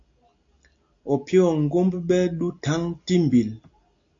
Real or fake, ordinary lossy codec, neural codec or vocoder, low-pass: real; AAC, 48 kbps; none; 7.2 kHz